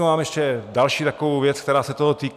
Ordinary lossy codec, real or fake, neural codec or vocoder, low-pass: MP3, 96 kbps; real; none; 14.4 kHz